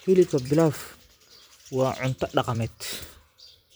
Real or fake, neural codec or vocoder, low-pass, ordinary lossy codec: fake; vocoder, 44.1 kHz, 128 mel bands every 256 samples, BigVGAN v2; none; none